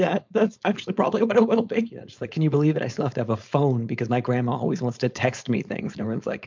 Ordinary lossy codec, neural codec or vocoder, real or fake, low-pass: MP3, 64 kbps; codec, 16 kHz, 4.8 kbps, FACodec; fake; 7.2 kHz